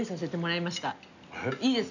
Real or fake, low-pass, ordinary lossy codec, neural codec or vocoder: real; 7.2 kHz; none; none